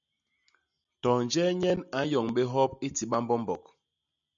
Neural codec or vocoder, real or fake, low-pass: none; real; 7.2 kHz